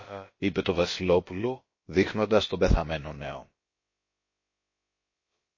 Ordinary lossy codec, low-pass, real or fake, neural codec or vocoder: MP3, 32 kbps; 7.2 kHz; fake; codec, 16 kHz, about 1 kbps, DyCAST, with the encoder's durations